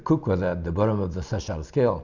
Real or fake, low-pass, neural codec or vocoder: real; 7.2 kHz; none